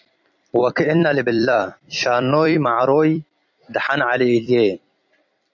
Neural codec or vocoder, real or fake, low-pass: vocoder, 44.1 kHz, 128 mel bands every 256 samples, BigVGAN v2; fake; 7.2 kHz